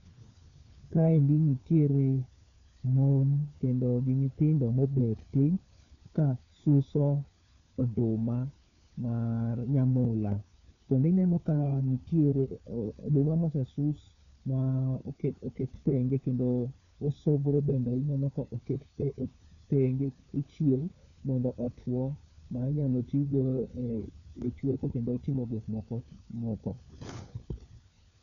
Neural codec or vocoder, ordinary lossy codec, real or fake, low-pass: codec, 16 kHz, 4 kbps, FunCodec, trained on LibriTTS, 50 frames a second; none; fake; 7.2 kHz